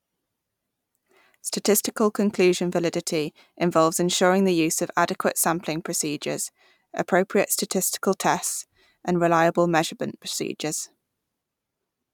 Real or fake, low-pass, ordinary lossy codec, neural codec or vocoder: fake; 19.8 kHz; none; vocoder, 44.1 kHz, 128 mel bands every 512 samples, BigVGAN v2